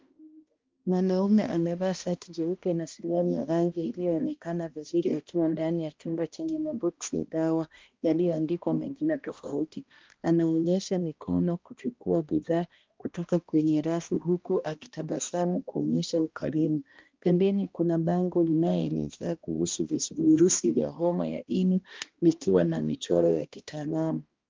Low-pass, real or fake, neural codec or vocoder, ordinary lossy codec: 7.2 kHz; fake; codec, 16 kHz, 1 kbps, X-Codec, HuBERT features, trained on balanced general audio; Opus, 32 kbps